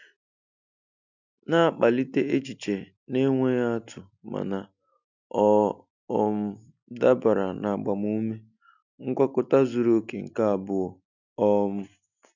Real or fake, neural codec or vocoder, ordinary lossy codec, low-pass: real; none; none; 7.2 kHz